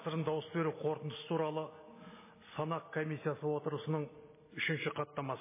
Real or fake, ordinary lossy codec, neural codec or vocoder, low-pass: real; MP3, 16 kbps; none; 3.6 kHz